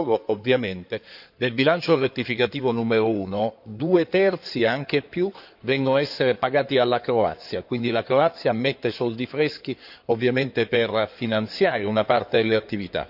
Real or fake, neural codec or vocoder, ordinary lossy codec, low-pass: fake; codec, 16 kHz in and 24 kHz out, 2.2 kbps, FireRedTTS-2 codec; none; 5.4 kHz